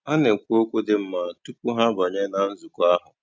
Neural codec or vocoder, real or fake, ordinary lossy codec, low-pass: none; real; none; none